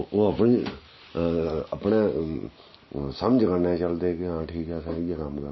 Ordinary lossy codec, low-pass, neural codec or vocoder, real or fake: MP3, 24 kbps; 7.2 kHz; none; real